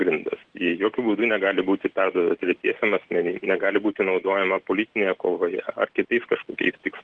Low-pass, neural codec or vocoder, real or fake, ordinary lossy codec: 9.9 kHz; none; real; Opus, 16 kbps